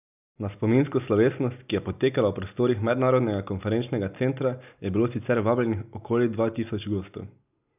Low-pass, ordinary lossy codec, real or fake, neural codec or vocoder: 3.6 kHz; none; real; none